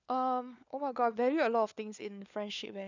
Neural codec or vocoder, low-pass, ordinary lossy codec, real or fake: codec, 16 kHz, 4 kbps, FunCodec, trained on LibriTTS, 50 frames a second; 7.2 kHz; none; fake